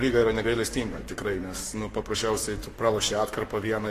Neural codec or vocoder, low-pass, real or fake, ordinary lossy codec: codec, 44.1 kHz, 7.8 kbps, Pupu-Codec; 14.4 kHz; fake; AAC, 48 kbps